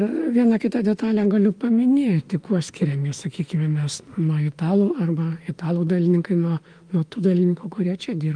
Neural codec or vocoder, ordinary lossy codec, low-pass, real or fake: autoencoder, 48 kHz, 32 numbers a frame, DAC-VAE, trained on Japanese speech; Opus, 32 kbps; 9.9 kHz; fake